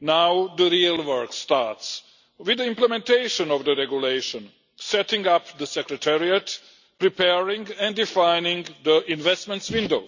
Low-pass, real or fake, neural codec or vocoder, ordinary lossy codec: 7.2 kHz; real; none; none